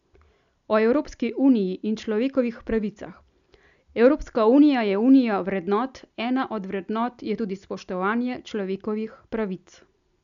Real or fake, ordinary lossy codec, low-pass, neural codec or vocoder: real; none; 7.2 kHz; none